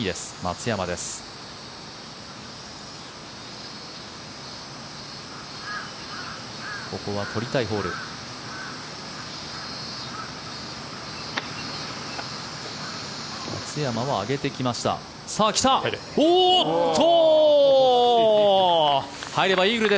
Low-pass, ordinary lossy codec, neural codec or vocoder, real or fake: none; none; none; real